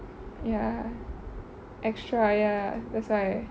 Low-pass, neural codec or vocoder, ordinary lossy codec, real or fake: none; none; none; real